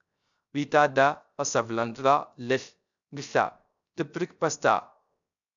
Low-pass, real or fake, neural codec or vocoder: 7.2 kHz; fake; codec, 16 kHz, 0.3 kbps, FocalCodec